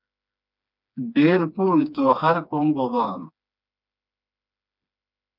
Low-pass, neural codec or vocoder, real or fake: 5.4 kHz; codec, 16 kHz, 2 kbps, FreqCodec, smaller model; fake